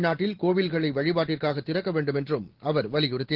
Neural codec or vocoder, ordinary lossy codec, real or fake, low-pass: none; Opus, 16 kbps; real; 5.4 kHz